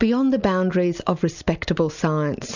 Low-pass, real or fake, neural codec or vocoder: 7.2 kHz; real; none